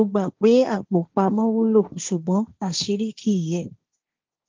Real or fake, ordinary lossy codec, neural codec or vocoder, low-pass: fake; Opus, 32 kbps; codec, 16 kHz, 1.1 kbps, Voila-Tokenizer; 7.2 kHz